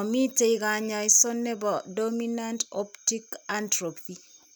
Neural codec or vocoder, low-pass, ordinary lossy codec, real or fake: none; none; none; real